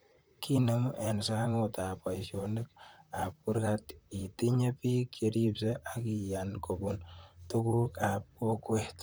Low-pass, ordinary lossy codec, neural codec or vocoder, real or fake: none; none; vocoder, 44.1 kHz, 128 mel bands, Pupu-Vocoder; fake